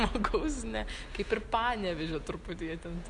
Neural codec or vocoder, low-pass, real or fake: none; 10.8 kHz; real